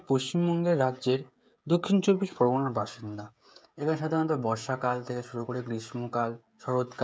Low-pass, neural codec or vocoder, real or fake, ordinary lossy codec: none; codec, 16 kHz, 16 kbps, FreqCodec, smaller model; fake; none